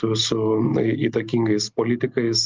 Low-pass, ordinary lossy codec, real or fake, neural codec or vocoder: 7.2 kHz; Opus, 24 kbps; real; none